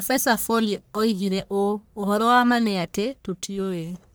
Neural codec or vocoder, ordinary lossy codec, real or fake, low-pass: codec, 44.1 kHz, 1.7 kbps, Pupu-Codec; none; fake; none